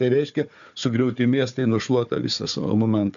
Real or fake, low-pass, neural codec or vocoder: fake; 7.2 kHz; codec, 16 kHz, 4 kbps, FunCodec, trained on Chinese and English, 50 frames a second